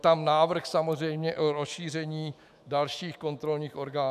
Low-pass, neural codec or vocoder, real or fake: 14.4 kHz; autoencoder, 48 kHz, 128 numbers a frame, DAC-VAE, trained on Japanese speech; fake